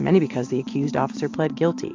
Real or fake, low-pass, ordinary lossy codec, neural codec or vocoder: fake; 7.2 kHz; AAC, 48 kbps; vocoder, 44.1 kHz, 128 mel bands every 512 samples, BigVGAN v2